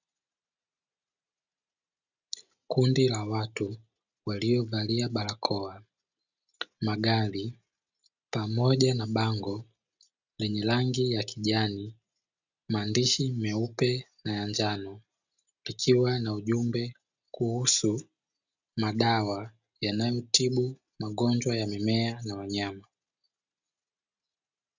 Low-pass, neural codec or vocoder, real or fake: 7.2 kHz; none; real